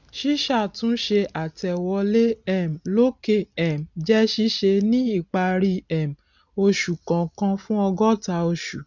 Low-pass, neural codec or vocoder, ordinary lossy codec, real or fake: 7.2 kHz; none; none; real